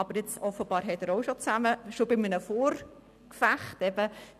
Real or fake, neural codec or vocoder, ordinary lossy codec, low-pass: real; none; none; 14.4 kHz